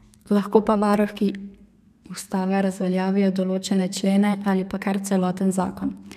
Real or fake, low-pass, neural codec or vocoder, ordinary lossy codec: fake; 14.4 kHz; codec, 32 kHz, 1.9 kbps, SNAC; none